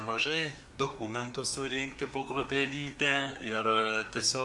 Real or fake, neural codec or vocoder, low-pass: fake; codec, 24 kHz, 1 kbps, SNAC; 10.8 kHz